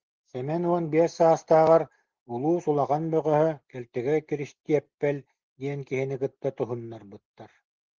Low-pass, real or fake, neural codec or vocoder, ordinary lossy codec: 7.2 kHz; real; none; Opus, 16 kbps